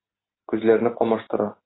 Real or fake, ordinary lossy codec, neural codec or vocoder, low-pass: real; AAC, 16 kbps; none; 7.2 kHz